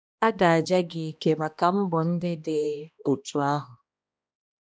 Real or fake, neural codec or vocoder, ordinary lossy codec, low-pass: fake; codec, 16 kHz, 1 kbps, X-Codec, HuBERT features, trained on balanced general audio; none; none